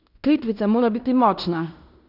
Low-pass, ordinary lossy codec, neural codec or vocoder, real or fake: 5.4 kHz; none; codec, 16 kHz in and 24 kHz out, 0.9 kbps, LongCat-Audio-Codec, fine tuned four codebook decoder; fake